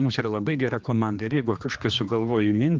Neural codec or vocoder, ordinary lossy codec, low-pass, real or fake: codec, 16 kHz, 2 kbps, X-Codec, HuBERT features, trained on general audio; Opus, 32 kbps; 7.2 kHz; fake